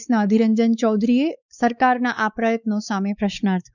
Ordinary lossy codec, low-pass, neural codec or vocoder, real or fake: none; 7.2 kHz; codec, 16 kHz, 4 kbps, X-Codec, WavLM features, trained on Multilingual LibriSpeech; fake